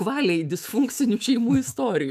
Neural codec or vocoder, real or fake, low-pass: autoencoder, 48 kHz, 128 numbers a frame, DAC-VAE, trained on Japanese speech; fake; 14.4 kHz